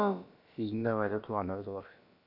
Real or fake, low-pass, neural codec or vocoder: fake; 5.4 kHz; codec, 16 kHz, about 1 kbps, DyCAST, with the encoder's durations